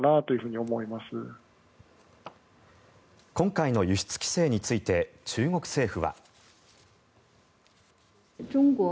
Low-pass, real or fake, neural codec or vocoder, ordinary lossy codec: none; real; none; none